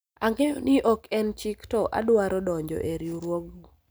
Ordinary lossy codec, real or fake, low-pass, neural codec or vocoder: none; real; none; none